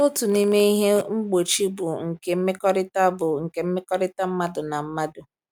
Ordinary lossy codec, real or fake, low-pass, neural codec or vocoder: none; real; 19.8 kHz; none